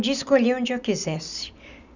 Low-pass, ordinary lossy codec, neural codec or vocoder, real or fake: 7.2 kHz; none; none; real